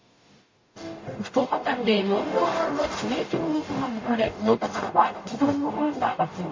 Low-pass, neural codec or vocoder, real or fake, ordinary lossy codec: 7.2 kHz; codec, 44.1 kHz, 0.9 kbps, DAC; fake; MP3, 32 kbps